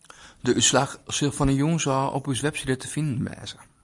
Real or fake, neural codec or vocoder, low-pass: real; none; 10.8 kHz